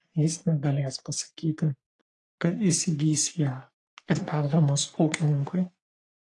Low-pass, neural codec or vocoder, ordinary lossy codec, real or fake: 10.8 kHz; codec, 44.1 kHz, 3.4 kbps, Pupu-Codec; AAC, 48 kbps; fake